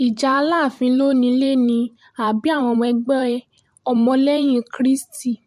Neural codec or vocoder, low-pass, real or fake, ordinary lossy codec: vocoder, 44.1 kHz, 128 mel bands every 512 samples, BigVGAN v2; 14.4 kHz; fake; MP3, 64 kbps